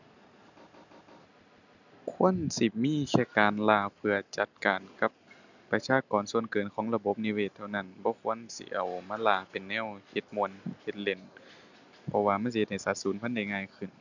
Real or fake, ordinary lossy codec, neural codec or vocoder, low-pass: real; none; none; 7.2 kHz